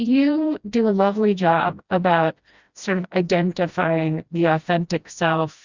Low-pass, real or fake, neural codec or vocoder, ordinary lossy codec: 7.2 kHz; fake; codec, 16 kHz, 1 kbps, FreqCodec, smaller model; Opus, 64 kbps